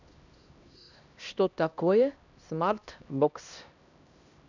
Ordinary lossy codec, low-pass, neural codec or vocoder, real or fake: none; 7.2 kHz; codec, 16 kHz, 1 kbps, X-Codec, HuBERT features, trained on LibriSpeech; fake